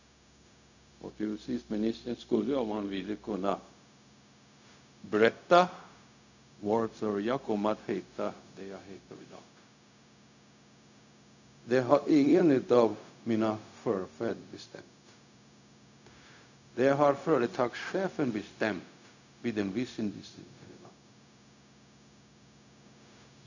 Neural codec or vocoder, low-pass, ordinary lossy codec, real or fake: codec, 16 kHz, 0.4 kbps, LongCat-Audio-Codec; 7.2 kHz; none; fake